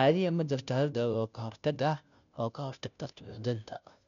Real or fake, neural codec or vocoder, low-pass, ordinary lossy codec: fake; codec, 16 kHz, 0.5 kbps, FunCodec, trained on Chinese and English, 25 frames a second; 7.2 kHz; none